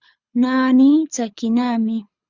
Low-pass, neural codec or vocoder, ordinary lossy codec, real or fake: 7.2 kHz; codec, 24 kHz, 6 kbps, HILCodec; Opus, 64 kbps; fake